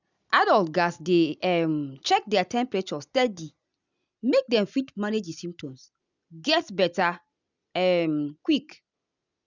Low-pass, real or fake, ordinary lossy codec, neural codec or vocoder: 7.2 kHz; real; none; none